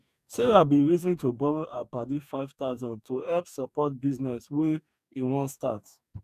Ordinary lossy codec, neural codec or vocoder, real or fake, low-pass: none; codec, 44.1 kHz, 2.6 kbps, DAC; fake; 14.4 kHz